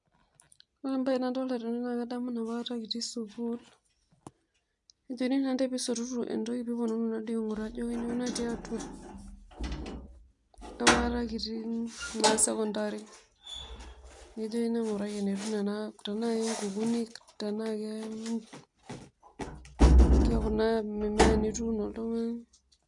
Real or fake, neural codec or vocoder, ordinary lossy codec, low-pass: real; none; none; 10.8 kHz